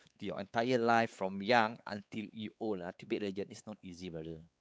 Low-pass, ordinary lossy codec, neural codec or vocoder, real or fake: none; none; codec, 16 kHz, 4 kbps, X-Codec, WavLM features, trained on Multilingual LibriSpeech; fake